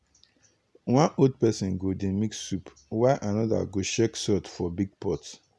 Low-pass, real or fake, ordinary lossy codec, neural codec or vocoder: none; real; none; none